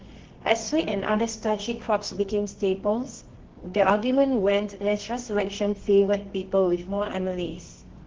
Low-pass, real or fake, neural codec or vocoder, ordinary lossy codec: 7.2 kHz; fake; codec, 24 kHz, 0.9 kbps, WavTokenizer, medium music audio release; Opus, 16 kbps